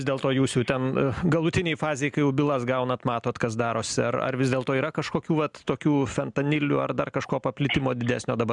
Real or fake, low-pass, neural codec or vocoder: real; 10.8 kHz; none